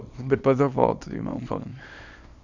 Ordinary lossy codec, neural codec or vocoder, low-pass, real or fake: none; codec, 24 kHz, 0.9 kbps, WavTokenizer, small release; 7.2 kHz; fake